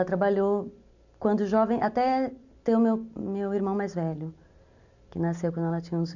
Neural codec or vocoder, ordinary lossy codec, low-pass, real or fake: none; none; 7.2 kHz; real